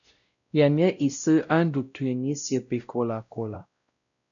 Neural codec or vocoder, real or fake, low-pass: codec, 16 kHz, 0.5 kbps, X-Codec, WavLM features, trained on Multilingual LibriSpeech; fake; 7.2 kHz